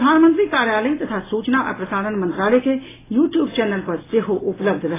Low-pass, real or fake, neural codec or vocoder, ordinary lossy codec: 3.6 kHz; real; none; AAC, 16 kbps